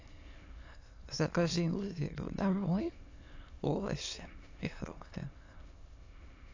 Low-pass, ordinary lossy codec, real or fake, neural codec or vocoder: 7.2 kHz; AAC, 48 kbps; fake; autoencoder, 22.05 kHz, a latent of 192 numbers a frame, VITS, trained on many speakers